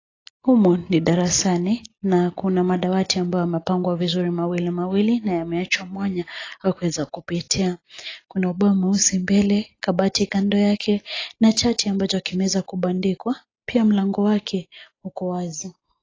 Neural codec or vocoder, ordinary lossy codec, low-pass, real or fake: none; AAC, 32 kbps; 7.2 kHz; real